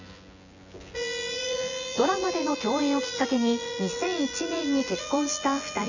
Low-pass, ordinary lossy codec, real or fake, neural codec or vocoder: 7.2 kHz; none; fake; vocoder, 24 kHz, 100 mel bands, Vocos